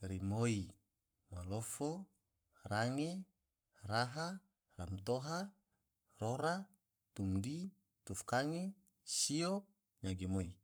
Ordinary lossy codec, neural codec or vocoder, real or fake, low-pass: none; codec, 44.1 kHz, 7.8 kbps, Pupu-Codec; fake; none